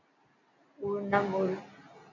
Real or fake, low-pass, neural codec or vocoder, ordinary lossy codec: real; 7.2 kHz; none; MP3, 64 kbps